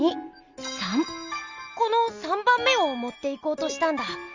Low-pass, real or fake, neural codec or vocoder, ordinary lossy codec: 7.2 kHz; real; none; Opus, 32 kbps